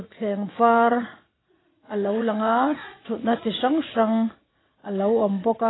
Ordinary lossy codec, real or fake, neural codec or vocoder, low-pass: AAC, 16 kbps; fake; vocoder, 44.1 kHz, 80 mel bands, Vocos; 7.2 kHz